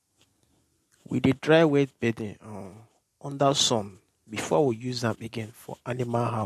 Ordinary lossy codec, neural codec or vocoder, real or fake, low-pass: MP3, 64 kbps; none; real; 14.4 kHz